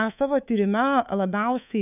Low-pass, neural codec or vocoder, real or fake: 3.6 kHz; codec, 24 kHz, 3.1 kbps, DualCodec; fake